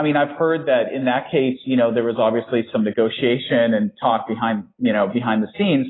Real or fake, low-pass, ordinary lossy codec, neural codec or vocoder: real; 7.2 kHz; AAC, 16 kbps; none